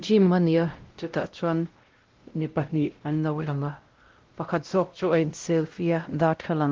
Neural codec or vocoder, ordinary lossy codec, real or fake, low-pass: codec, 16 kHz, 0.5 kbps, X-Codec, WavLM features, trained on Multilingual LibriSpeech; Opus, 16 kbps; fake; 7.2 kHz